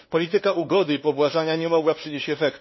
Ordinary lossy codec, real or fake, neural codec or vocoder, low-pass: MP3, 24 kbps; fake; codec, 16 kHz, 2 kbps, FunCodec, trained on LibriTTS, 25 frames a second; 7.2 kHz